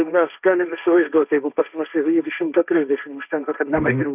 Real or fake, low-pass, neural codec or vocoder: fake; 3.6 kHz; codec, 16 kHz, 1.1 kbps, Voila-Tokenizer